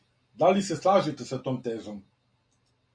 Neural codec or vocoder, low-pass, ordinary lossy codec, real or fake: none; 9.9 kHz; MP3, 48 kbps; real